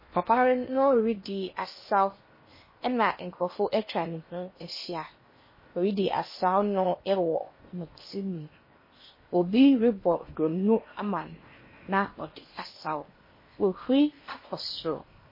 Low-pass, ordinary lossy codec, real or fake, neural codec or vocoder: 5.4 kHz; MP3, 24 kbps; fake; codec, 16 kHz in and 24 kHz out, 0.8 kbps, FocalCodec, streaming, 65536 codes